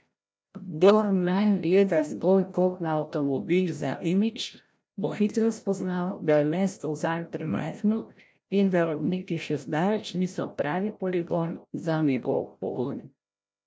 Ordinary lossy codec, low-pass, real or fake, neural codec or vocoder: none; none; fake; codec, 16 kHz, 0.5 kbps, FreqCodec, larger model